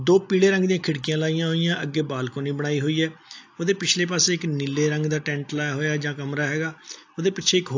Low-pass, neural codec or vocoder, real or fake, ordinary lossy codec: 7.2 kHz; none; real; AAC, 48 kbps